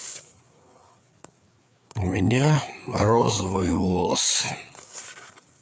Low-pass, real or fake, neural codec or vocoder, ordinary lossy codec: none; fake; codec, 16 kHz, 4 kbps, FreqCodec, larger model; none